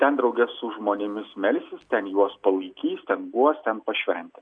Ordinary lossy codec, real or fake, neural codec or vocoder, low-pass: MP3, 64 kbps; real; none; 9.9 kHz